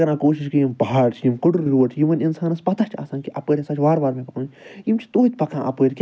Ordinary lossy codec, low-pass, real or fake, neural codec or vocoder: none; none; real; none